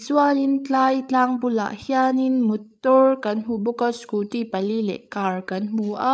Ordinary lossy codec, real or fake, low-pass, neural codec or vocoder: none; fake; none; codec, 16 kHz, 8 kbps, FreqCodec, larger model